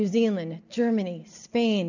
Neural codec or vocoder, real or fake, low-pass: none; real; 7.2 kHz